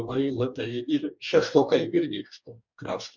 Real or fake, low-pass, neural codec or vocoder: fake; 7.2 kHz; codec, 44.1 kHz, 2.6 kbps, DAC